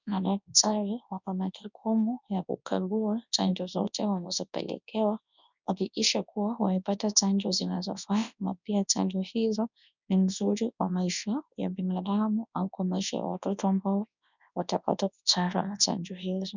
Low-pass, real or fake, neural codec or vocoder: 7.2 kHz; fake; codec, 24 kHz, 0.9 kbps, WavTokenizer, large speech release